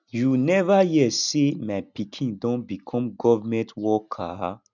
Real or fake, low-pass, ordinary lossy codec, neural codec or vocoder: real; 7.2 kHz; none; none